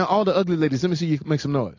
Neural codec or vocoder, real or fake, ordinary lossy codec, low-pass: none; real; AAC, 48 kbps; 7.2 kHz